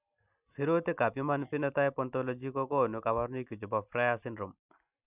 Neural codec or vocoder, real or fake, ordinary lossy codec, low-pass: none; real; none; 3.6 kHz